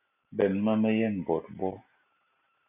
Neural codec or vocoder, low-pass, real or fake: none; 3.6 kHz; real